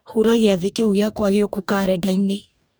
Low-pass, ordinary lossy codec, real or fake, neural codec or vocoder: none; none; fake; codec, 44.1 kHz, 2.6 kbps, DAC